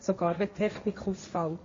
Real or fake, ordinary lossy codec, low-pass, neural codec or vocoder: fake; MP3, 32 kbps; 7.2 kHz; codec, 16 kHz, 1.1 kbps, Voila-Tokenizer